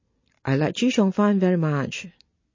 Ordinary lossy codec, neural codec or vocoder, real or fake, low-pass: MP3, 32 kbps; none; real; 7.2 kHz